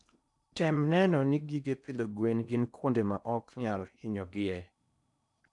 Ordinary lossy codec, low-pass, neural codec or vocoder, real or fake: none; 10.8 kHz; codec, 16 kHz in and 24 kHz out, 0.8 kbps, FocalCodec, streaming, 65536 codes; fake